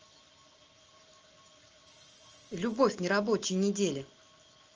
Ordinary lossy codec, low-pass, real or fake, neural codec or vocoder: Opus, 24 kbps; 7.2 kHz; real; none